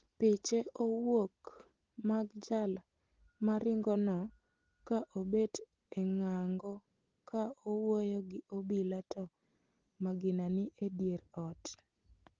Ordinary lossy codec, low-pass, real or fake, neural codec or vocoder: Opus, 16 kbps; 7.2 kHz; real; none